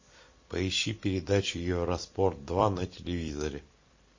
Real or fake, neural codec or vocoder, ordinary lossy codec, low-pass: fake; vocoder, 44.1 kHz, 128 mel bands every 256 samples, BigVGAN v2; MP3, 32 kbps; 7.2 kHz